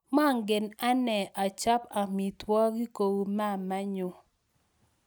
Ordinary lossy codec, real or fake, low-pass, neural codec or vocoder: none; real; none; none